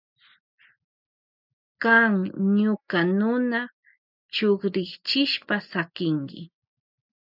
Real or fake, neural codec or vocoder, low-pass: real; none; 5.4 kHz